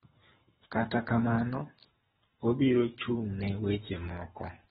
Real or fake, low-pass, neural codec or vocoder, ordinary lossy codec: fake; 10.8 kHz; codec, 24 kHz, 3 kbps, HILCodec; AAC, 16 kbps